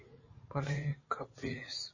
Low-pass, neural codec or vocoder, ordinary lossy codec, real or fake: 7.2 kHz; vocoder, 44.1 kHz, 80 mel bands, Vocos; MP3, 32 kbps; fake